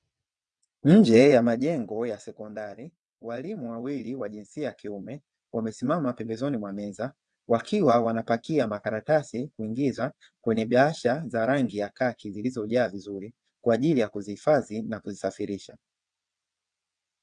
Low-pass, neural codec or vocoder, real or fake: 9.9 kHz; vocoder, 22.05 kHz, 80 mel bands, WaveNeXt; fake